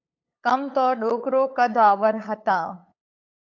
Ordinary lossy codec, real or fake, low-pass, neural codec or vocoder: AAC, 48 kbps; fake; 7.2 kHz; codec, 16 kHz, 8 kbps, FunCodec, trained on LibriTTS, 25 frames a second